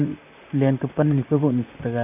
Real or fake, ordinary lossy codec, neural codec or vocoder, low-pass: fake; none; vocoder, 44.1 kHz, 128 mel bands, Pupu-Vocoder; 3.6 kHz